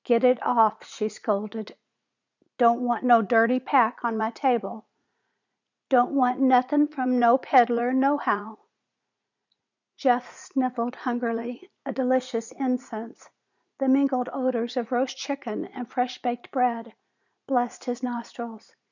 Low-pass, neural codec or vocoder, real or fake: 7.2 kHz; vocoder, 44.1 kHz, 80 mel bands, Vocos; fake